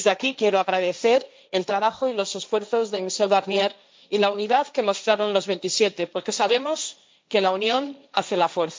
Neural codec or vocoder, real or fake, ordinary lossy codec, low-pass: codec, 16 kHz, 1.1 kbps, Voila-Tokenizer; fake; none; none